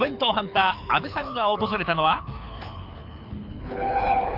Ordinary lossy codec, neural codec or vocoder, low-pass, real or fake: AAC, 48 kbps; codec, 24 kHz, 6 kbps, HILCodec; 5.4 kHz; fake